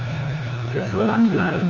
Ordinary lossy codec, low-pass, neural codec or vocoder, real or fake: none; 7.2 kHz; codec, 16 kHz, 1 kbps, FunCodec, trained on LibriTTS, 50 frames a second; fake